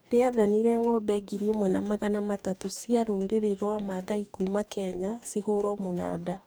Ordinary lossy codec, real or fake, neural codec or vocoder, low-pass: none; fake; codec, 44.1 kHz, 2.6 kbps, DAC; none